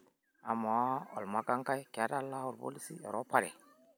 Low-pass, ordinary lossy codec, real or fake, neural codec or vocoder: none; none; real; none